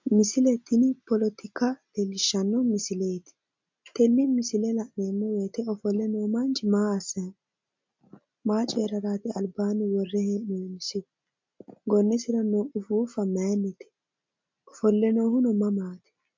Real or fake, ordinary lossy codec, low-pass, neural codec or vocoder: real; MP3, 64 kbps; 7.2 kHz; none